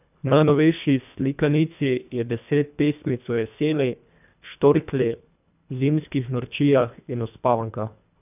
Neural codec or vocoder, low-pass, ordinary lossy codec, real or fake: codec, 24 kHz, 1.5 kbps, HILCodec; 3.6 kHz; none; fake